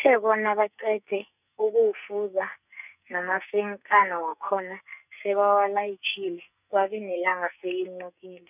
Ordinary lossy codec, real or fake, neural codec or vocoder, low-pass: none; fake; codec, 44.1 kHz, 2.6 kbps, SNAC; 3.6 kHz